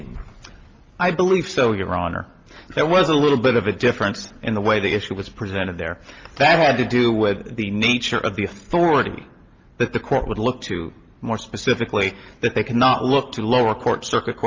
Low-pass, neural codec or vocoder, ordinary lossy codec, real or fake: 7.2 kHz; none; Opus, 24 kbps; real